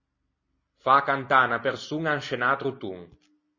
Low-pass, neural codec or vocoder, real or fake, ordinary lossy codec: 7.2 kHz; none; real; MP3, 32 kbps